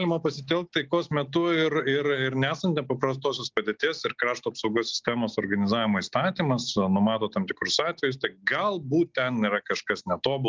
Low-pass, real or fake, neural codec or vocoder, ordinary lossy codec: 7.2 kHz; real; none; Opus, 32 kbps